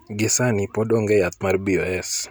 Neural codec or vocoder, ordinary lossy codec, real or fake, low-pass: none; none; real; none